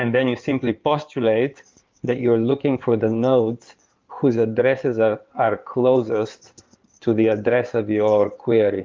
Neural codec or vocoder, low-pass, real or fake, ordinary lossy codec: codec, 16 kHz in and 24 kHz out, 2.2 kbps, FireRedTTS-2 codec; 7.2 kHz; fake; Opus, 32 kbps